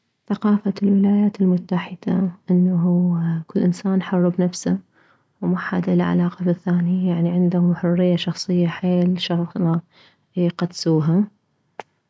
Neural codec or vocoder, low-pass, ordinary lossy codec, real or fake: none; none; none; real